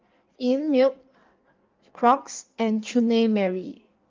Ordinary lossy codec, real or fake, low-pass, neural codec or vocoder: Opus, 32 kbps; fake; 7.2 kHz; codec, 16 kHz in and 24 kHz out, 1.1 kbps, FireRedTTS-2 codec